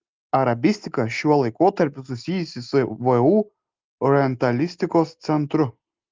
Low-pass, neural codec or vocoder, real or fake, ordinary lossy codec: 7.2 kHz; none; real; Opus, 32 kbps